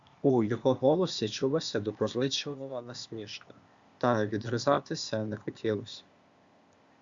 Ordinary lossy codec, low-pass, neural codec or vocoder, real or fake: Opus, 64 kbps; 7.2 kHz; codec, 16 kHz, 0.8 kbps, ZipCodec; fake